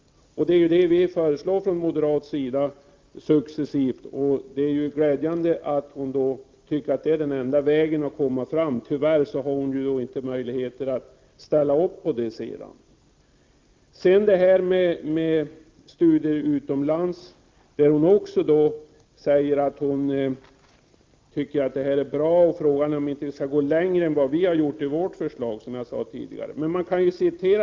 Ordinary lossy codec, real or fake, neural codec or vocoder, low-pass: Opus, 32 kbps; real; none; 7.2 kHz